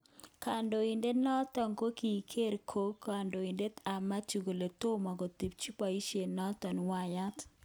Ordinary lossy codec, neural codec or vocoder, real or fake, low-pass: none; none; real; none